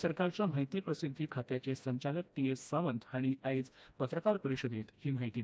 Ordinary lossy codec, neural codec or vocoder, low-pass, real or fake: none; codec, 16 kHz, 1 kbps, FreqCodec, smaller model; none; fake